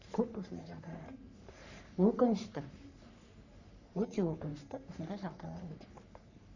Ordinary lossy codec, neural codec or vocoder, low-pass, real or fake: none; codec, 44.1 kHz, 3.4 kbps, Pupu-Codec; 7.2 kHz; fake